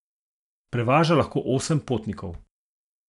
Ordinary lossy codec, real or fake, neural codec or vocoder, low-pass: none; real; none; 10.8 kHz